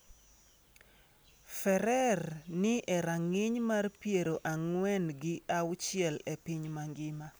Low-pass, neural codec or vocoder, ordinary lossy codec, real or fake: none; none; none; real